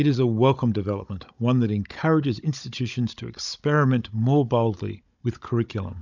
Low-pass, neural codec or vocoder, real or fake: 7.2 kHz; codec, 16 kHz, 16 kbps, FunCodec, trained on Chinese and English, 50 frames a second; fake